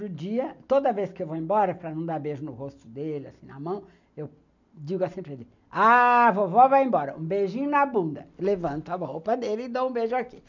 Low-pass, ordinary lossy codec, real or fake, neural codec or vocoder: 7.2 kHz; none; real; none